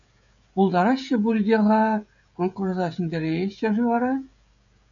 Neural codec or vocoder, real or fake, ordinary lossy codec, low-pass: codec, 16 kHz, 16 kbps, FreqCodec, smaller model; fake; MP3, 96 kbps; 7.2 kHz